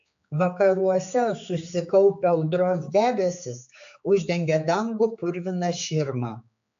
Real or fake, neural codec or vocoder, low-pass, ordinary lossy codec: fake; codec, 16 kHz, 4 kbps, X-Codec, HuBERT features, trained on general audio; 7.2 kHz; AAC, 64 kbps